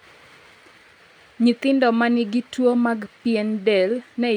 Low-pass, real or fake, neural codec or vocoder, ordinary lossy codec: 19.8 kHz; real; none; none